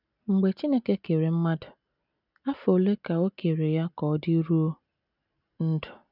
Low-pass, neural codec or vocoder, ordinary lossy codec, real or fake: 5.4 kHz; none; none; real